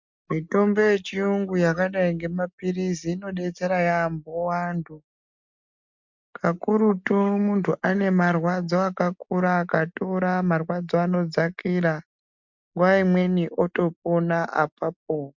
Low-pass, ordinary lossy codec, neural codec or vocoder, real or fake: 7.2 kHz; MP3, 64 kbps; none; real